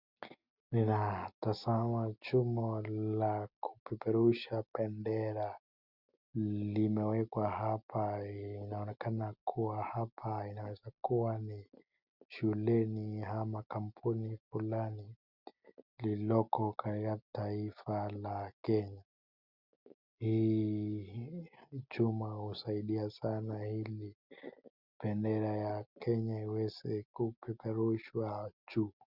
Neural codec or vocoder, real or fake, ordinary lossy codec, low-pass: none; real; Opus, 64 kbps; 5.4 kHz